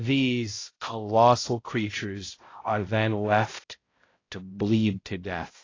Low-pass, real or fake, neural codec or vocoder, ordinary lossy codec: 7.2 kHz; fake; codec, 16 kHz, 0.5 kbps, X-Codec, HuBERT features, trained on balanced general audio; AAC, 32 kbps